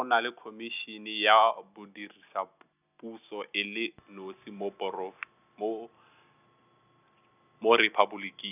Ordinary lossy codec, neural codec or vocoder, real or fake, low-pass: none; none; real; 3.6 kHz